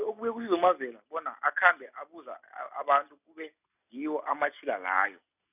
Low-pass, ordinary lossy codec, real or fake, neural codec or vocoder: 3.6 kHz; none; real; none